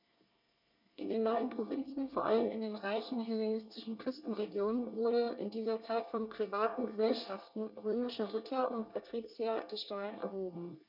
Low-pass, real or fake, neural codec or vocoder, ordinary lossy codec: 5.4 kHz; fake; codec, 24 kHz, 1 kbps, SNAC; none